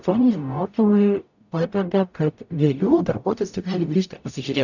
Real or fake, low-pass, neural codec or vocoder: fake; 7.2 kHz; codec, 44.1 kHz, 0.9 kbps, DAC